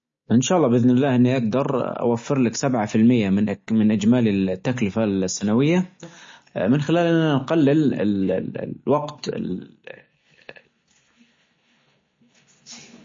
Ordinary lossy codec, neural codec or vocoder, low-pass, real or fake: MP3, 32 kbps; none; 7.2 kHz; real